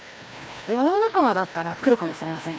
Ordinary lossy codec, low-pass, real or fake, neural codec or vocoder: none; none; fake; codec, 16 kHz, 1 kbps, FreqCodec, larger model